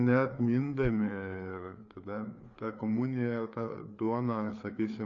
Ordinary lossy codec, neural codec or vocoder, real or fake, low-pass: AAC, 48 kbps; codec, 16 kHz, 4 kbps, FreqCodec, larger model; fake; 7.2 kHz